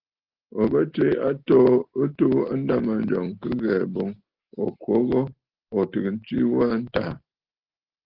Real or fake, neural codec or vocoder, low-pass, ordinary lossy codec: real; none; 5.4 kHz; Opus, 16 kbps